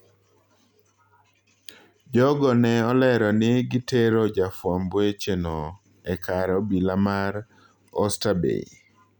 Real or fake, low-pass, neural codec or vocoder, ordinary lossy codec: real; 19.8 kHz; none; none